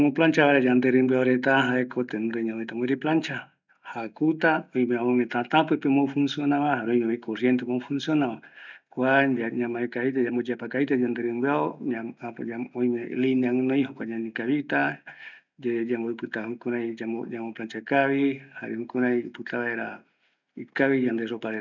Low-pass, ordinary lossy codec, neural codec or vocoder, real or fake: 7.2 kHz; none; none; real